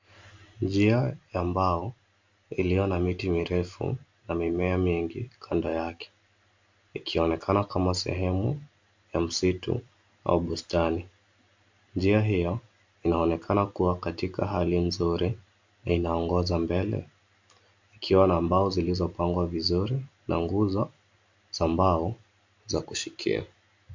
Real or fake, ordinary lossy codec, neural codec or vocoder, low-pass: real; MP3, 64 kbps; none; 7.2 kHz